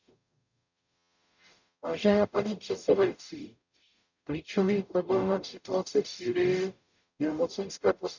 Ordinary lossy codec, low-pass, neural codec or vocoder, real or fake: none; 7.2 kHz; codec, 44.1 kHz, 0.9 kbps, DAC; fake